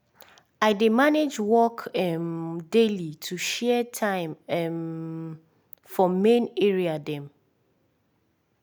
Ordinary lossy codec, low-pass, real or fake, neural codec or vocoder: none; none; real; none